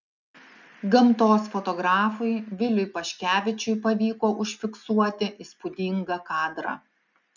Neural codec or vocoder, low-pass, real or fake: none; 7.2 kHz; real